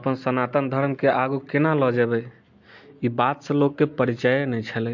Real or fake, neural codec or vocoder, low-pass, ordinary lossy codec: real; none; 7.2 kHz; MP3, 48 kbps